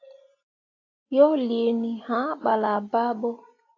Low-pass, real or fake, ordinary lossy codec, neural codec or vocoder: 7.2 kHz; real; AAC, 32 kbps; none